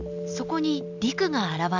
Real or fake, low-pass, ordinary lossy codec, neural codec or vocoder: real; 7.2 kHz; none; none